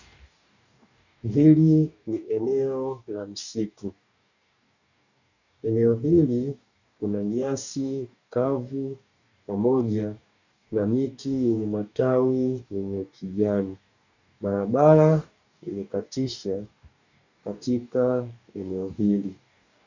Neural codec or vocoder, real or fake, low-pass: codec, 44.1 kHz, 2.6 kbps, DAC; fake; 7.2 kHz